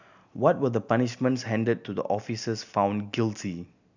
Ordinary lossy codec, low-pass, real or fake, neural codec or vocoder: none; 7.2 kHz; real; none